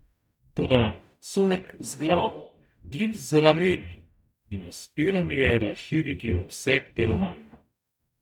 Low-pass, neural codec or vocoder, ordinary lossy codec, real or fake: 19.8 kHz; codec, 44.1 kHz, 0.9 kbps, DAC; none; fake